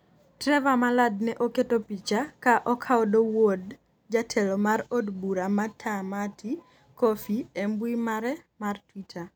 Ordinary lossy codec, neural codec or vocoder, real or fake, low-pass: none; none; real; none